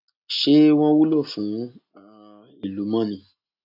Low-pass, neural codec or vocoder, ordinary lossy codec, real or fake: 5.4 kHz; none; AAC, 48 kbps; real